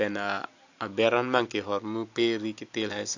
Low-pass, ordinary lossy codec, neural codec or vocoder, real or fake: 7.2 kHz; AAC, 48 kbps; none; real